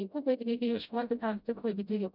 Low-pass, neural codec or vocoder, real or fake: 5.4 kHz; codec, 16 kHz, 0.5 kbps, FreqCodec, smaller model; fake